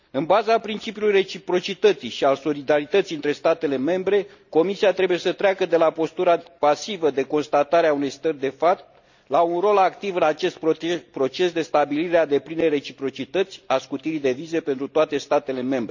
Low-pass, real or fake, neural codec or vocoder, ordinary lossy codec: 7.2 kHz; real; none; none